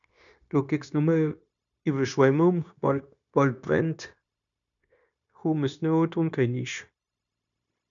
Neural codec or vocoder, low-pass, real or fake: codec, 16 kHz, 0.9 kbps, LongCat-Audio-Codec; 7.2 kHz; fake